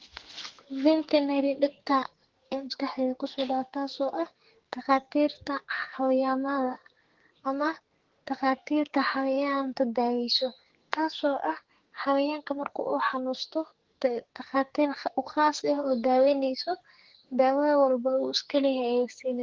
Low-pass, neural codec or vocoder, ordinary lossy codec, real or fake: 7.2 kHz; codec, 44.1 kHz, 2.6 kbps, SNAC; Opus, 16 kbps; fake